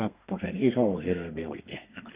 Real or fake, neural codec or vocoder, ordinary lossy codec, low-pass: fake; codec, 32 kHz, 1.9 kbps, SNAC; Opus, 64 kbps; 3.6 kHz